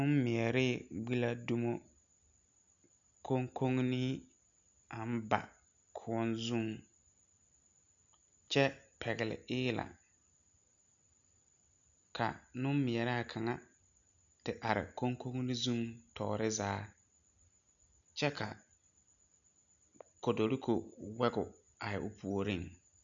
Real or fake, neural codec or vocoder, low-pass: real; none; 7.2 kHz